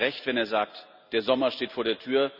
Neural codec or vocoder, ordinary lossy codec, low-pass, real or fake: none; none; 5.4 kHz; real